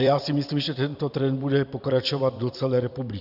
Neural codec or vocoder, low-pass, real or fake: vocoder, 44.1 kHz, 128 mel bands every 256 samples, BigVGAN v2; 5.4 kHz; fake